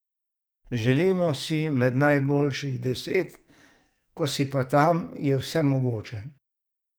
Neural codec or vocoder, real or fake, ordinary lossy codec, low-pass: codec, 44.1 kHz, 2.6 kbps, SNAC; fake; none; none